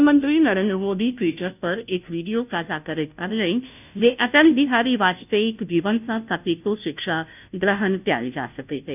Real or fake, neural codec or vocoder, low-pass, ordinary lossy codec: fake; codec, 16 kHz, 0.5 kbps, FunCodec, trained on Chinese and English, 25 frames a second; 3.6 kHz; none